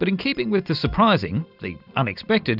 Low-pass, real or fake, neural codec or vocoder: 5.4 kHz; real; none